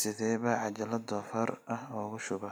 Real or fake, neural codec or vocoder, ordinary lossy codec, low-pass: real; none; none; none